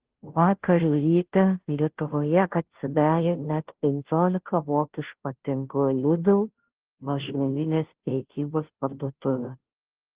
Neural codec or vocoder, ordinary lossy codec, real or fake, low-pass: codec, 16 kHz, 0.5 kbps, FunCodec, trained on Chinese and English, 25 frames a second; Opus, 16 kbps; fake; 3.6 kHz